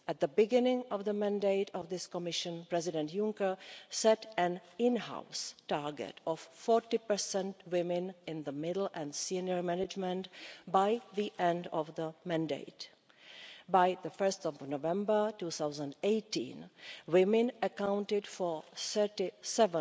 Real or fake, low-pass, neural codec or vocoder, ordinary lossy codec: real; none; none; none